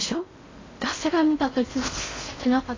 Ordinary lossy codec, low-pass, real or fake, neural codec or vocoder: AAC, 32 kbps; 7.2 kHz; fake; codec, 16 kHz in and 24 kHz out, 0.6 kbps, FocalCodec, streaming, 4096 codes